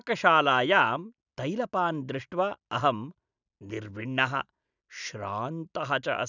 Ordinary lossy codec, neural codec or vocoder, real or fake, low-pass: none; none; real; 7.2 kHz